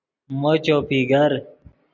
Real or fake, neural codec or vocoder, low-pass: real; none; 7.2 kHz